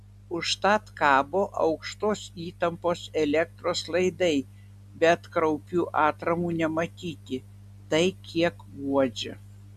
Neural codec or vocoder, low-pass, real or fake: none; 14.4 kHz; real